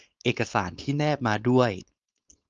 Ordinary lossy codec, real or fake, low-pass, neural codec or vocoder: Opus, 24 kbps; fake; 7.2 kHz; codec, 16 kHz, 4.8 kbps, FACodec